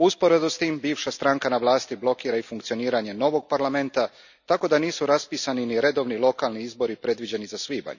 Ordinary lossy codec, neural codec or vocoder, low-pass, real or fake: none; none; 7.2 kHz; real